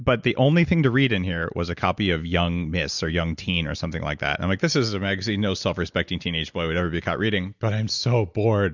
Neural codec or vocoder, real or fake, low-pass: none; real; 7.2 kHz